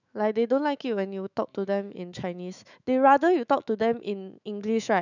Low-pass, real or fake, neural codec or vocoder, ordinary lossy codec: 7.2 kHz; fake; autoencoder, 48 kHz, 128 numbers a frame, DAC-VAE, trained on Japanese speech; none